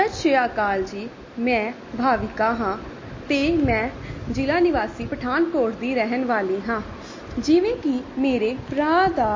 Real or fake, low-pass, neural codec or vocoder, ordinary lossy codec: real; 7.2 kHz; none; MP3, 32 kbps